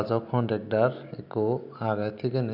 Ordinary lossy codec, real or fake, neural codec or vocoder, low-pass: none; real; none; 5.4 kHz